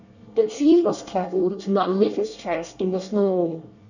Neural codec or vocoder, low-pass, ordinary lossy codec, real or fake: codec, 24 kHz, 1 kbps, SNAC; 7.2 kHz; none; fake